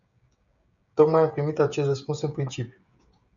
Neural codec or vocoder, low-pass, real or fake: codec, 16 kHz, 16 kbps, FreqCodec, smaller model; 7.2 kHz; fake